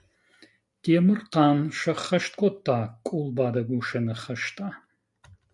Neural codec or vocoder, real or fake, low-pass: none; real; 10.8 kHz